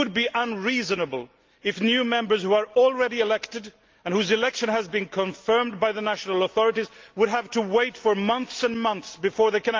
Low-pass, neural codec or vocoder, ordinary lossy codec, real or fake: 7.2 kHz; none; Opus, 32 kbps; real